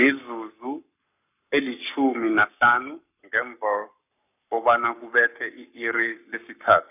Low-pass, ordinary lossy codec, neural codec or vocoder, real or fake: 3.6 kHz; none; codec, 44.1 kHz, 7.8 kbps, Pupu-Codec; fake